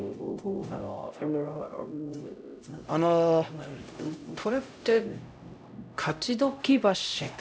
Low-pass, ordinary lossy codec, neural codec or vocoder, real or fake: none; none; codec, 16 kHz, 0.5 kbps, X-Codec, HuBERT features, trained on LibriSpeech; fake